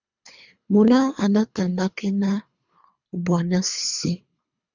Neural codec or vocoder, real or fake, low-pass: codec, 24 kHz, 3 kbps, HILCodec; fake; 7.2 kHz